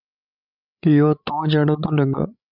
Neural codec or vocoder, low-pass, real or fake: codec, 16 kHz, 16 kbps, FreqCodec, larger model; 5.4 kHz; fake